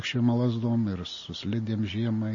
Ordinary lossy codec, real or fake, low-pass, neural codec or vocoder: MP3, 32 kbps; real; 7.2 kHz; none